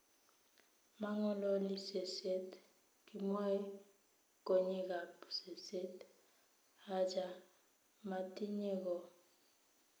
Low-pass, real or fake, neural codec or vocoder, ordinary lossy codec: none; real; none; none